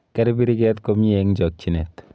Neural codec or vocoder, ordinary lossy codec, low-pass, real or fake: none; none; none; real